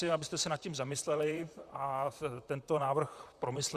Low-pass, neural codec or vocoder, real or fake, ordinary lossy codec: 14.4 kHz; vocoder, 44.1 kHz, 128 mel bands, Pupu-Vocoder; fake; Opus, 64 kbps